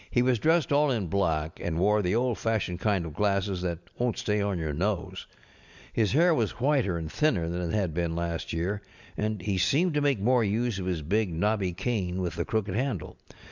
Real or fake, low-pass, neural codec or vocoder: real; 7.2 kHz; none